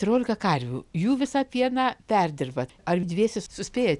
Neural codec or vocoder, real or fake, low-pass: none; real; 10.8 kHz